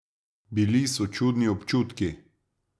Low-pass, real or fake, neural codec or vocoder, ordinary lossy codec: none; real; none; none